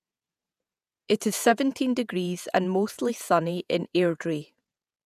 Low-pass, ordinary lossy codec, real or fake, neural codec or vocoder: 14.4 kHz; none; fake; vocoder, 48 kHz, 128 mel bands, Vocos